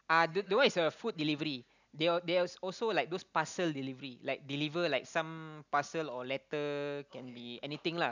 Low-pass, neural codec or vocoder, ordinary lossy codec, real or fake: 7.2 kHz; none; none; real